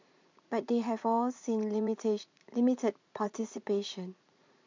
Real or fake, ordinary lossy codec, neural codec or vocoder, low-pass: fake; MP3, 64 kbps; vocoder, 44.1 kHz, 80 mel bands, Vocos; 7.2 kHz